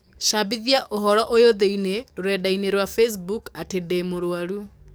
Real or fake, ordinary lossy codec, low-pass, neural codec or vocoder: fake; none; none; codec, 44.1 kHz, 7.8 kbps, DAC